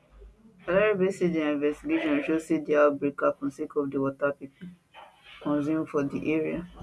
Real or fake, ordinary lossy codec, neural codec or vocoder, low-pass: real; none; none; none